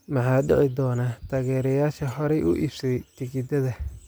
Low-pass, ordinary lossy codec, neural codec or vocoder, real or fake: none; none; none; real